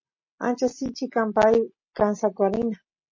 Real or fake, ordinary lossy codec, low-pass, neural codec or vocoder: real; MP3, 32 kbps; 7.2 kHz; none